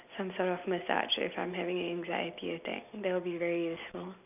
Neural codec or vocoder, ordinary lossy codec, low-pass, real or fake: none; none; 3.6 kHz; real